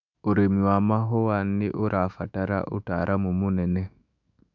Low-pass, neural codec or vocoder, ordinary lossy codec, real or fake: 7.2 kHz; autoencoder, 48 kHz, 128 numbers a frame, DAC-VAE, trained on Japanese speech; none; fake